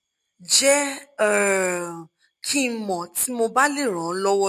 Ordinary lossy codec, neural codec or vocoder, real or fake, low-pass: MP3, 64 kbps; none; real; 14.4 kHz